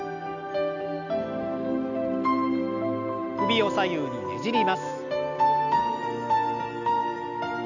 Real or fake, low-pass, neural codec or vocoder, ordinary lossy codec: real; 7.2 kHz; none; none